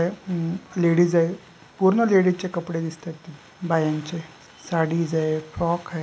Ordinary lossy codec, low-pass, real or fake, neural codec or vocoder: none; none; real; none